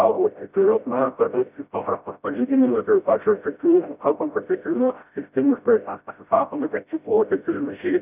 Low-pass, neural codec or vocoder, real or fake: 3.6 kHz; codec, 16 kHz, 0.5 kbps, FreqCodec, smaller model; fake